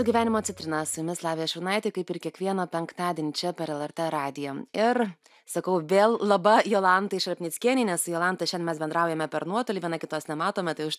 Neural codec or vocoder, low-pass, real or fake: none; 14.4 kHz; real